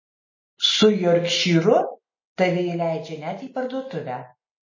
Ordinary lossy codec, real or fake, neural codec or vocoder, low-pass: MP3, 32 kbps; real; none; 7.2 kHz